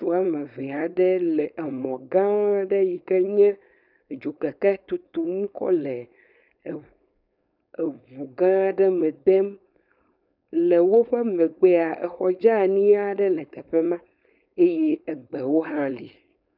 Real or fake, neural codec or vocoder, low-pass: fake; codec, 16 kHz, 4.8 kbps, FACodec; 5.4 kHz